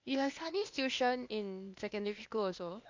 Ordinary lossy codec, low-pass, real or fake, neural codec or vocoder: MP3, 64 kbps; 7.2 kHz; fake; codec, 16 kHz, 0.8 kbps, ZipCodec